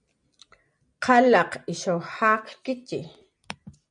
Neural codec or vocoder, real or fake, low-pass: none; real; 9.9 kHz